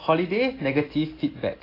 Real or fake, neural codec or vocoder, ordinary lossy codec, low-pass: real; none; AAC, 24 kbps; 5.4 kHz